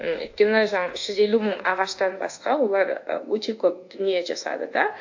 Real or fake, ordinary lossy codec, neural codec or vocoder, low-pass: fake; AAC, 48 kbps; codec, 24 kHz, 1.2 kbps, DualCodec; 7.2 kHz